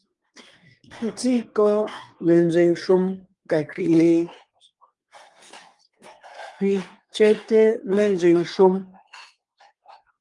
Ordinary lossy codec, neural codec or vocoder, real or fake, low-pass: Opus, 32 kbps; codec, 24 kHz, 1 kbps, SNAC; fake; 10.8 kHz